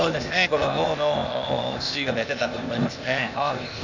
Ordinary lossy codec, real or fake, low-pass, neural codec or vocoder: none; fake; 7.2 kHz; codec, 16 kHz, 0.8 kbps, ZipCodec